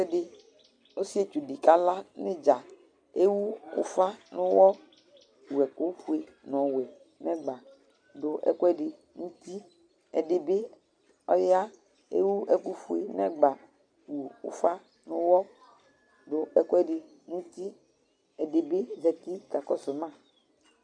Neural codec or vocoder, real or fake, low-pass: none; real; 9.9 kHz